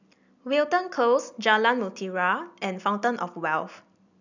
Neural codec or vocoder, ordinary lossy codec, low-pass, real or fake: none; none; 7.2 kHz; real